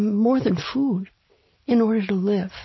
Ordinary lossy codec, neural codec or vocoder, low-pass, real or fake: MP3, 24 kbps; none; 7.2 kHz; real